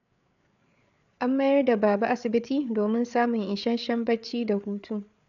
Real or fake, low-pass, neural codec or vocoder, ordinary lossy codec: fake; 7.2 kHz; codec, 16 kHz, 8 kbps, FreqCodec, larger model; none